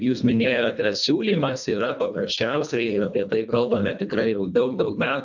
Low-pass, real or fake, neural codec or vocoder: 7.2 kHz; fake; codec, 24 kHz, 1.5 kbps, HILCodec